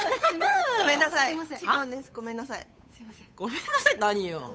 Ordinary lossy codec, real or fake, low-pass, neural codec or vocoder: none; fake; none; codec, 16 kHz, 8 kbps, FunCodec, trained on Chinese and English, 25 frames a second